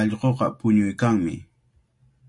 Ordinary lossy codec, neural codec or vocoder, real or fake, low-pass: MP3, 48 kbps; none; real; 10.8 kHz